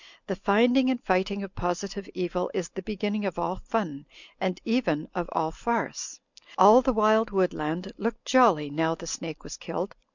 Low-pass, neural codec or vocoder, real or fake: 7.2 kHz; none; real